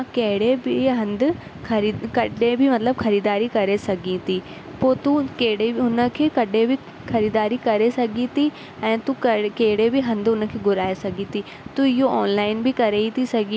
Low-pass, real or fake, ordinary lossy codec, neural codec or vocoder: none; real; none; none